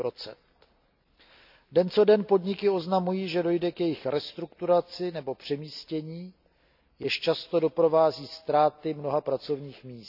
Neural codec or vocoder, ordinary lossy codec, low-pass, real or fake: none; none; 5.4 kHz; real